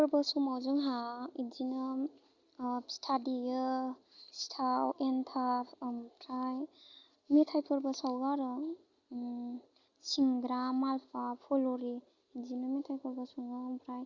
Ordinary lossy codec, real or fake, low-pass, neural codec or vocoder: Opus, 64 kbps; real; 7.2 kHz; none